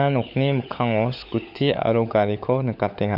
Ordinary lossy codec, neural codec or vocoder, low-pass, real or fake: none; codec, 16 kHz, 16 kbps, FunCodec, trained on LibriTTS, 50 frames a second; 5.4 kHz; fake